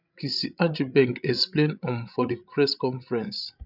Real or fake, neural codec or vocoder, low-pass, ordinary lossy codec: fake; codec, 16 kHz, 16 kbps, FreqCodec, larger model; 5.4 kHz; none